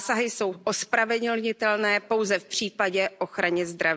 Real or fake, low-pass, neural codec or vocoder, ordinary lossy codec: real; none; none; none